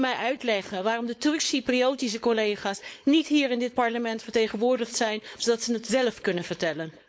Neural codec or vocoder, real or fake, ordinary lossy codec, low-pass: codec, 16 kHz, 4.8 kbps, FACodec; fake; none; none